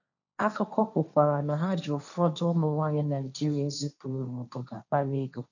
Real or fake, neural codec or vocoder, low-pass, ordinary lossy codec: fake; codec, 16 kHz, 1.1 kbps, Voila-Tokenizer; 7.2 kHz; none